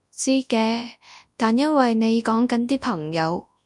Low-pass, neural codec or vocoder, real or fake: 10.8 kHz; codec, 24 kHz, 0.9 kbps, WavTokenizer, large speech release; fake